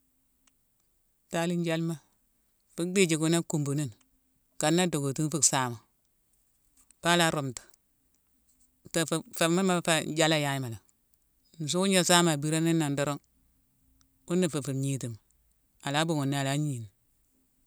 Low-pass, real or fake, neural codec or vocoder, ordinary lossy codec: none; real; none; none